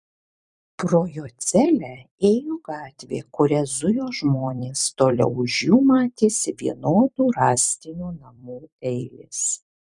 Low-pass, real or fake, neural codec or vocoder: 10.8 kHz; fake; vocoder, 44.1 kHz, 128 mel bands every 256 samples, BigVGAN v2